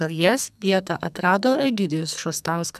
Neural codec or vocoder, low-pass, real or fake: codec, 44.1 kHz, 2.6 kbps, SNAC; 14.4 kHz; fake